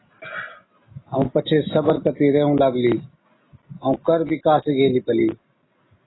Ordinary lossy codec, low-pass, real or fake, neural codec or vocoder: AAC, 16 kbps; 7.2 kHz; real; none